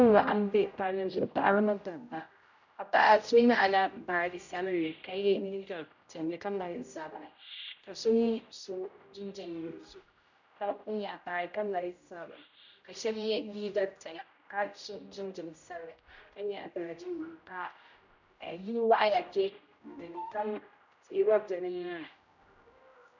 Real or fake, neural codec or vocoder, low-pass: fake; codec, 16 kHz, 0.5 kbps, X-Codec, HuBERT features, trained on general audio; 7.2 kHz